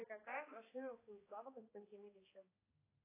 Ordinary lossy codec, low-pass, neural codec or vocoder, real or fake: AAC, 16 kbps; 3.6 kHz; codec, 16 kHz, 2 kbps, X-Codec, HuBERT features, trained on balanced general audio; fake